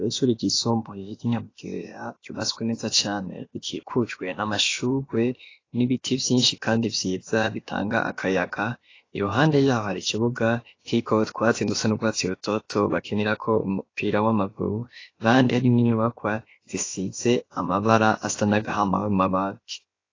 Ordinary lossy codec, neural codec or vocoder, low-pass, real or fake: AAC, 32 kbps; codec, 16 kHz, about 1 kbps, DyCAST, with the encoder's durations; 7.2 kHz; fake